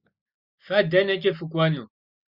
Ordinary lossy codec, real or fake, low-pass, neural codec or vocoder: Opus, 64 kbps; real; 5.4 kHz; none